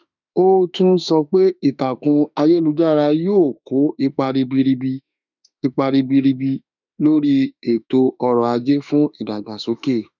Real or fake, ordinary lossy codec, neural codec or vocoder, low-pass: fake; none; autoencoder, 48 kHz, 32 numbers a frame, DAC-VAE, trained on Japanese speech; 7.2 kHz